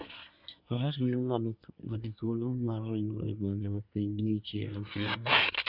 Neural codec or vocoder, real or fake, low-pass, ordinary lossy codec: codec, 24 kHz, 1 kbps, SNAC; fake; 5.4 kHz; none